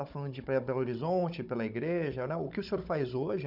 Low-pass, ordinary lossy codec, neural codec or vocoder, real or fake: 5.4 kHz; none; codec, 16 kHz, 16 kbps, FunCodec, trained on Chinese and English, 50 frames a second; fake